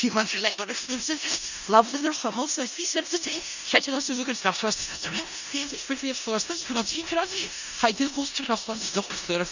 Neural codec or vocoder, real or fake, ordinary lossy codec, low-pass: codec, 16 kHz in and 24 kHz out, 0.4 kbps, LongCat-Audio-Codec, four codebook decoder; fake; none; 7.2 kHz